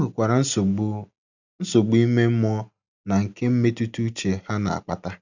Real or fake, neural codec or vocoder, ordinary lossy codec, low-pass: real; none; none; 7.2 kHz